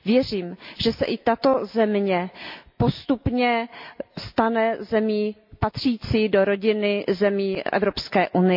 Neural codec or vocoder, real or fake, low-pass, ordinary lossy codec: none; real; 5.4 kHz; MP3, 48 kbps